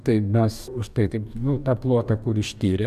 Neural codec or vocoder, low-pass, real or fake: codec, 44.1 kHz, 2.6 kbps, DAC; 14.4 kHz; fake